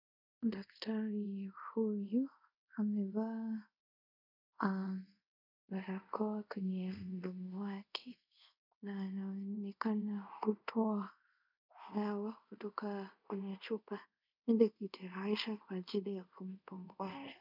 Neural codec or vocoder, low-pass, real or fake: codec, 24 kHz, 0.5 kbps, DualCodec; 5.4 kHz; fake